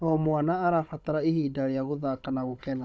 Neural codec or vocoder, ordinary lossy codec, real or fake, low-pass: codec, 16 kHz, 16 kbps, FunCodec, trained on Chinese and English, 50 frames a second; none; fake; none